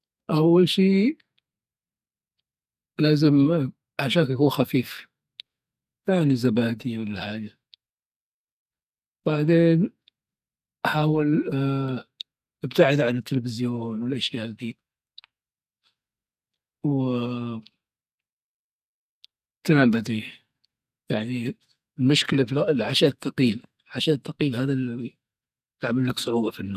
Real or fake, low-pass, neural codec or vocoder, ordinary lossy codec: fake; 14.4 kHz; codec, 44.1 kHz, 2.6 kbps, SNAC; none